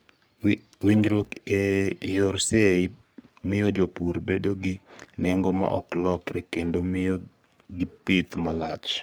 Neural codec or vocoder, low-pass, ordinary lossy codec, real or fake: codec, 44.1 kHz, 3.4 kbps, Pupu-Codec; none; none; fake